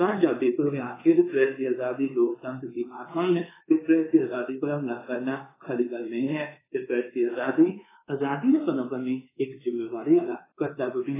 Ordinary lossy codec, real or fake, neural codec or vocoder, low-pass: AAC, 16 kbps; fake; codec, 24 kHz, 1.2 kbps, DualCodec; 3.6 kHz